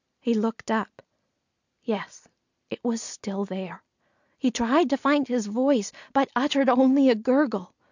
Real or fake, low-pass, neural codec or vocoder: real; 7.2 kHz; none